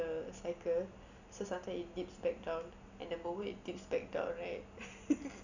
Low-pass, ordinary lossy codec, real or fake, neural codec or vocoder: 7.2 kHz; none; real; none